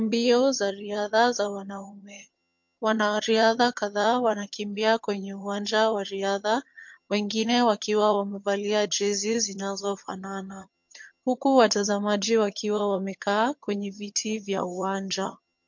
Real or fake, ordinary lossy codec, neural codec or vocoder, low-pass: fake; MP3, 48 kbps; vocoder, 22.05 kHz, 80 mel bands, HiFi-GAN; 7.2 kHz